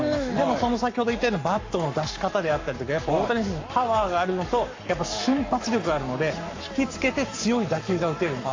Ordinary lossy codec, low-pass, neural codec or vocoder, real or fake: AAC, 48 kbps; 7.2 kHz; codec, 44.1 kHz, 7.8 kbps, Pupu-Codec; fake